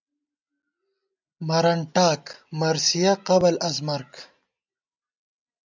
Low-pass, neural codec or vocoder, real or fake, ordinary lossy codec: 7.2 kHz; none; real; MP3, 64 kbps